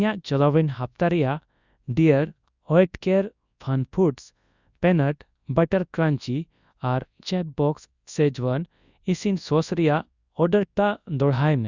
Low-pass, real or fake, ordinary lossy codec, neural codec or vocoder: 7.2 kHz; fake; Opus, 64 kbps; codec, 24 kHz, 0.9 kbps, WavTokenizer, large speech release